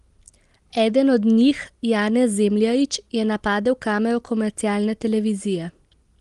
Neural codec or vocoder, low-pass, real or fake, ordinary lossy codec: none; 10.8 kHz; real; Opus, 24 kbps